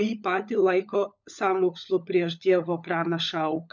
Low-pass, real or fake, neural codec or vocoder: 7.2 kHz; fake; codec, 16 kHz, 16 kbps, FunCodec, trained on LibriTTS, 50 frames a second